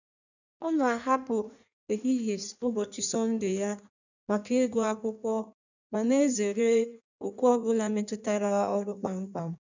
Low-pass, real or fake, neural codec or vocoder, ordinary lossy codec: 7.2 kHz; fake; codec, 16 kHz in and 24 kHz out, 1.1 kbps, FireRedTTS-2 codec; none